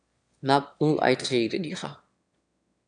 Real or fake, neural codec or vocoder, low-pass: fake; autoencoder, 22.05 kHz, a latent of 192 numbers a frame, VITS, trained on one speaker; 9.9 kHz